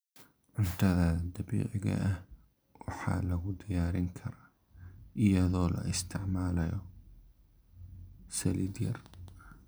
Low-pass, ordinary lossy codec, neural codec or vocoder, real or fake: none; none; none; real